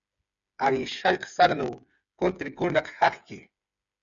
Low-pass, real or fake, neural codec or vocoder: 7.2 kHz; fake; codec, 16 kHz, 8 kbps, FreqCodec, smaller model